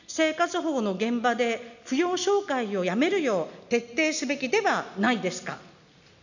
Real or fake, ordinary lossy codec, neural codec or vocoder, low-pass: real; none; none; 7.2 kHz